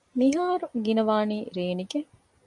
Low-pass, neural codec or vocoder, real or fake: 10.8 kHz; none; real